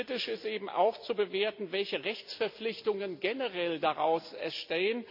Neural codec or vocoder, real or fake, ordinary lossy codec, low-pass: none; real; MP3, 48 kbps; 5.4 kHz